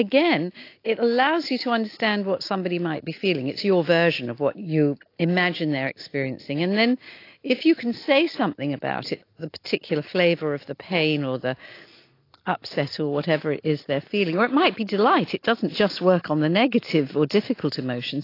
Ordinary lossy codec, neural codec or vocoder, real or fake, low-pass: AAC, 32 kbps; none; real; 5.4 kHz